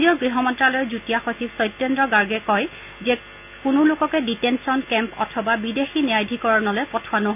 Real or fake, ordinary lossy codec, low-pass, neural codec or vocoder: real; none; 3.6 kHz; none